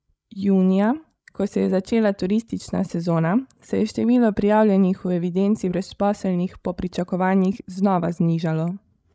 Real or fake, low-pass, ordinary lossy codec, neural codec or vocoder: fake; none; none; codec, 16 kHz, 16 kbps, FreqCodec, larger model